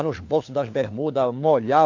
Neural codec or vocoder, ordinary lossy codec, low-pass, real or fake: codec, 16 kHz, 4 kbps, FunCodec, trained on LibriTTS, 50 frames a second; AAC, 48 kbps; 7.2 kHz; fake